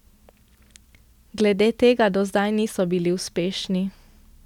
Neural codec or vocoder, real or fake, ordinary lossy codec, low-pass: none; real; none; 19.8 kHz